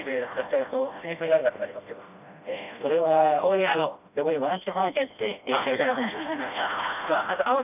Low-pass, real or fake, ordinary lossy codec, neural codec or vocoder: 3.6 kHz; fake; none; codec, 16 kHz, 1 kbps, FreqCodec, smaller model